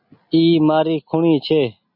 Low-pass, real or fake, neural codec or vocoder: 5.4 kHz; real; none